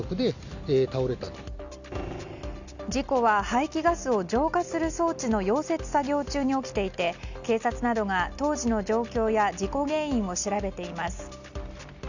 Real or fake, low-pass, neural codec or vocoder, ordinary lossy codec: real; 7.2 kHz; none; none